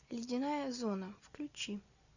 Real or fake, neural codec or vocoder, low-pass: real; none; 7.2 kHz